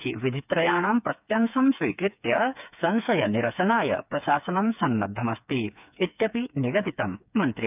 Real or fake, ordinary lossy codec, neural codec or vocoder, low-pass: fake; none; codec, 16 kHz, 4 kbps, FreqCodec, smaller model; 3.6 kHz